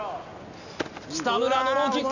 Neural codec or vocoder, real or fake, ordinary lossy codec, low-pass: none; real; none; 7.2 kHz